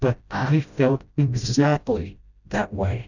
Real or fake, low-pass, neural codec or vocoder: fake; 7.2 kHz; codec, 16 kHz, 0.5 kbps, FreqCodec, smaller model